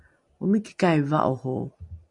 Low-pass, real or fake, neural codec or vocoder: 10.8 kHz; real; none